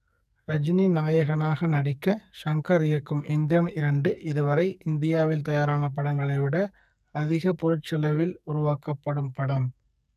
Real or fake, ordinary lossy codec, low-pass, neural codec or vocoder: fake; none; 14.4 kHz; codec, 44.1 kHz, 2.6 kbps, SNAC